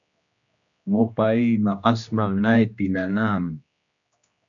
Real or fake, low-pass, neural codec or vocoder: fake; 7.2 kHz; codec, 16 kHz, 1 kbps, X-Codec, HuBERT features, trained on general audio